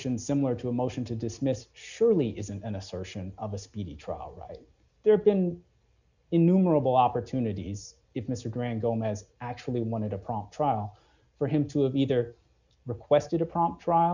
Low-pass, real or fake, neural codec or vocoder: 7.2 kHz; real; none